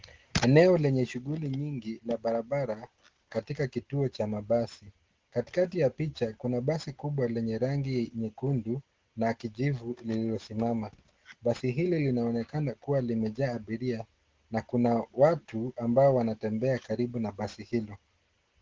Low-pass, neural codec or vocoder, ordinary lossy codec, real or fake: 7.2 kHz; none; Opus, 16 kbps; real